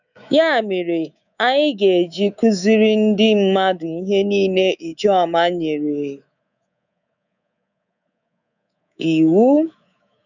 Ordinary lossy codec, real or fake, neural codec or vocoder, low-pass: none; fake; codec, 24 kHz, 3.1 kbps, DualCodec; 7.2 kHz